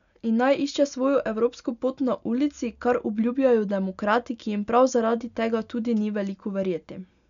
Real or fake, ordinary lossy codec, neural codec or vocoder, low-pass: real; none; none; 7.2 kHz